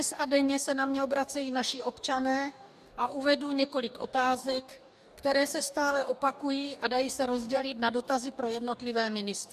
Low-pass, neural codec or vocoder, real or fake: 14.4 kHz; codec, 44.1 kHz, 2.6 kbps, DAC; fake